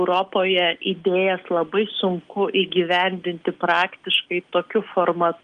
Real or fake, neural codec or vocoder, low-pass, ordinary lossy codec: real; none; 9.9 kHz; Opus, 24 kbps